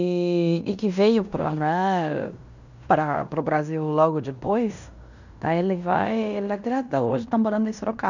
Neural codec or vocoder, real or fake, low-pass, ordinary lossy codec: codec, 16 kHz in and 24 kHz out, 0.9 kbps, LongCat-Audio-Codec, fine tuned four codebook decoder; fake; 7.2 kHz; none